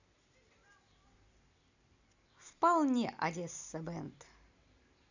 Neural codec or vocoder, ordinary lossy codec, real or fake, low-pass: none; none; real; 7.2 kHz